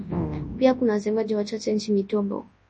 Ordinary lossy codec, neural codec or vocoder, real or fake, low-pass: MP3, 32 kbps; codec, 24 kHz, 0.9 kbps, WavTokenizer, large speech release; fake; 10.8 kHz